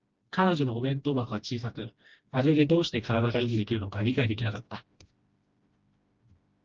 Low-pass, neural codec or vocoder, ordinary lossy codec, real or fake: 7.2 kHz; codec, 16 kHz, 1 kbps, FreqCodec, smaller model; Opus, 24 kbps; fake